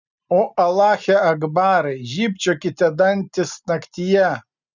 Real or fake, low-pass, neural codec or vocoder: real; 7.2 kHz; none